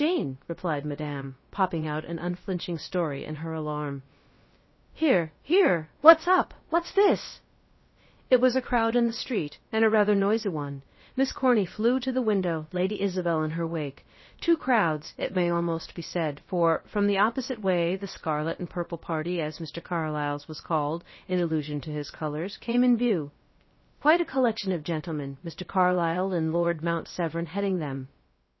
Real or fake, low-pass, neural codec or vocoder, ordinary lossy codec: fake; 7.2 kHz; codec, 16 kHz, about 1 kbps, DyCAST, with the encoder's durations; MP3, 24 kbps